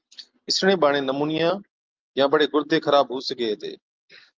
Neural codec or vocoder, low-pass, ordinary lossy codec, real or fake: none; 7.2 kHz; Opus, 24 kbps; real